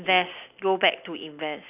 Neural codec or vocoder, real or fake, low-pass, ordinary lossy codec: none; real; 3.6 kHz; none